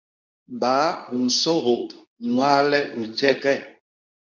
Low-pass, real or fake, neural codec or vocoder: 7.2 kHz; fake; codec, 24 kHz, 0.9 kbps, WavTokenizer, medium speech release version 2